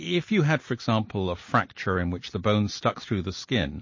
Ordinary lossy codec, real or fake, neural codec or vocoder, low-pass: MP3, 32 kbps; real; none; 7.2 kHz